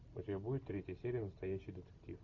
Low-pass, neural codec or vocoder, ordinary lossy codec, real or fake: 7.2 kHz; none; MP3, 64 kbps; real